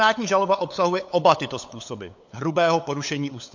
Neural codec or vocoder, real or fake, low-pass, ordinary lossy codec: codec, 16 kHz, 8 kbps, FunCodec, trained on LibriTTS, 25 frames a second; fake; 7.2 kHz; MP3, 48 kbps